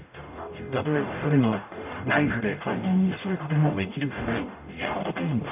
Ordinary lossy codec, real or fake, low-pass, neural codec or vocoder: none; fake; 3.6 kHz; codec, 44.1 kHz, 0.9 kbps, DAC